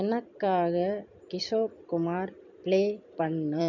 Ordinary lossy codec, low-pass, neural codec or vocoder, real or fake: none; 7.2 kHz; vocoder, 44.1 kHz, 128 mel bands every 256 samples, BigVGAN v2; fake